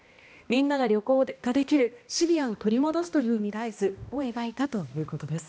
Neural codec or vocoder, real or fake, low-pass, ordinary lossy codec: codec, 16 kHz, 1 kbps, X-Codec, HuBERT features, trained on balanced general audio; fake; none; none